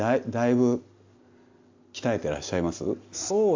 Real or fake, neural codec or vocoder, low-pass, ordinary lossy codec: real; none; 7.2 kHz; MP3, 64 kbps